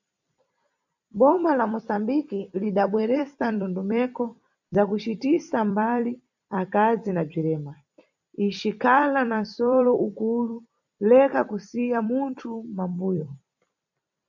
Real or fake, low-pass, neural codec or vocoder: fake; 7.2 kHz; vocoder, 44.1 kHz, 128 mel bands every 256 samples, BigVGAN v2